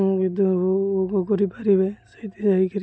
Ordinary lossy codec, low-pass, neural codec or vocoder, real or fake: none; none; none; real